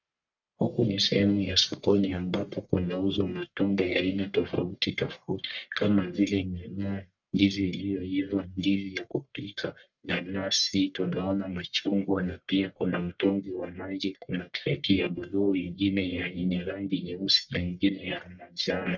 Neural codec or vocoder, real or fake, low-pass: codec, 44.1 kHz, 1.7 kbps, Pupu-Codec; fake; 7.2 kHz